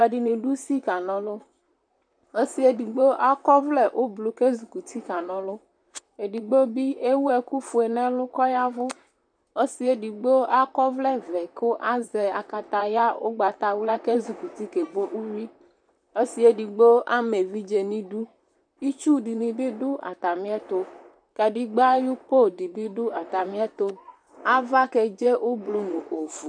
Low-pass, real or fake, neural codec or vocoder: 9.9 kHz; fake; vocoder, 44.1 kHz, 128 mel bands, Pupu-Vocoder